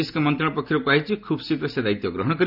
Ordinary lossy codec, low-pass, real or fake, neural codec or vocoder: none; 5.4 kHz; real; none